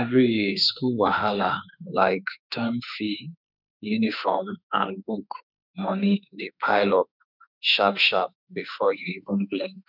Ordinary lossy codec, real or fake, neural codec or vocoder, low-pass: none; fake; autoencoder, 48 kHz, 32 numbers a frame, DAC-VAE, trained on Japanese speech; 5.4 kHz